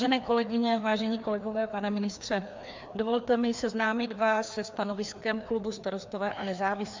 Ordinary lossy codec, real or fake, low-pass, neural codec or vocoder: MP3, 64 kbps; fake; 7.2 kHz; codec, 16 kHz, 2 kbps, FreqCodec, larger model